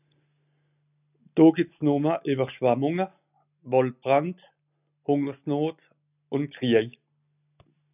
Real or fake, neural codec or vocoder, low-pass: real; none; 3.6 kHz